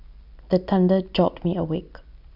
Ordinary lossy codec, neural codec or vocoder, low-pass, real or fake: none; none; 5.4 kHz; real